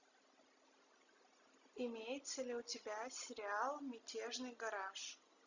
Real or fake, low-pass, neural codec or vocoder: real; 7.2 kHz; none